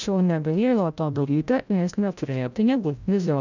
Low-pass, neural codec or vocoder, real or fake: 7.2 kHz; codec, 16 kHz, 0.5 kbps, FreqCodec, larger model; fake